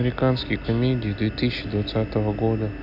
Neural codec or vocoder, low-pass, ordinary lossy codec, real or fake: none; 5.4 kHz; none; real